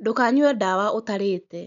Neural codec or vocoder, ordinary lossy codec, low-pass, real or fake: none; none; 7.2 kHz; real